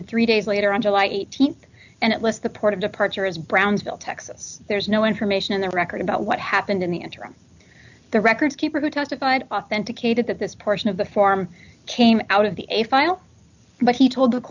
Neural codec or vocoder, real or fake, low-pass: none; real; 7.2 kHz